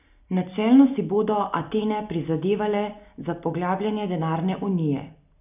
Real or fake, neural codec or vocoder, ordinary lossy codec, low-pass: real; none; none; 3.6 kHz